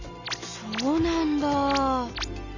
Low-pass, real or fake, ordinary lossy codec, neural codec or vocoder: 7.2 kHz; real; none; none